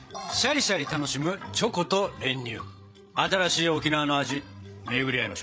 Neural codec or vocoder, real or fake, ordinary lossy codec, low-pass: codec, 16 kHz, 8 kbps, FreqCodec, larger model; fake; none; none